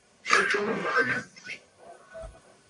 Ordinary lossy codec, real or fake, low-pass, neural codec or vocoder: AAC, 48 kbps; fake; 10.8 kHz; codec, 44.1 kHz, 1.7 kbps, Pupu-Codec